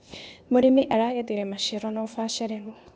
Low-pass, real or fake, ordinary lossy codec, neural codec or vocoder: none; fake; none; codec, 16 kHz, 0.8 kbps, ZipCodec